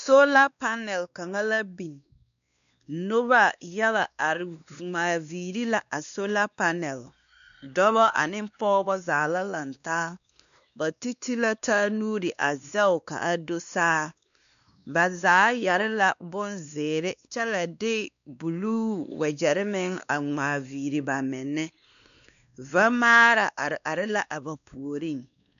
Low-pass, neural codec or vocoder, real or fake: 7.2 kHz; codec, 16 kHz, 2 kbps, X-Codec, WavLM features, trained on Multilingual LibriSpeech; fake